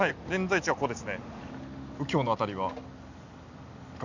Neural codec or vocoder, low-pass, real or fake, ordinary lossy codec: none; 7.2 kHz; real; none